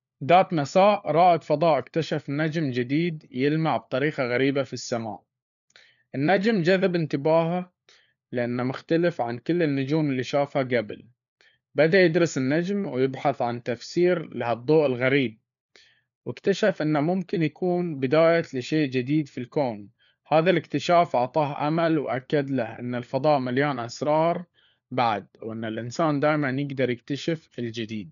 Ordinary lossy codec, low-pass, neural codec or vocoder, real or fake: none; 7.2 kHz; codec, 16 kHz, 4 kbps, FunCodec, trained on LibriTTS, 50 frames a second; fake